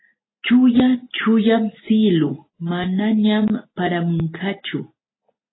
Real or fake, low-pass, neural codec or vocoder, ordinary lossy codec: real; 7.2 kHz; none; AAC, 16 kbps